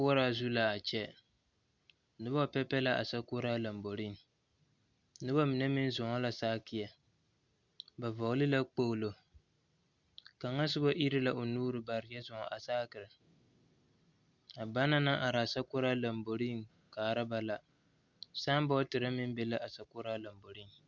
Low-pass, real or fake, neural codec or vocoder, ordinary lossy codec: 7.2 kHz; real; none; Opus, 64 kbps